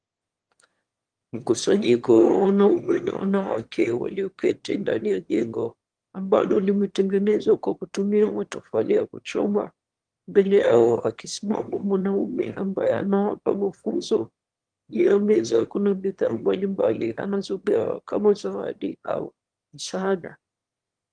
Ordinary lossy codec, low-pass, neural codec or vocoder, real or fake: Opus, 24 kbps; 9.9 kHz; autoencoder, 22.05 kHz, a latent of 192 numbers a frame, VITS, trained on one speaker; fake